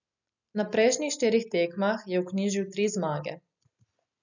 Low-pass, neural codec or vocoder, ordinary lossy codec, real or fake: 7.2 kHz; none; none; real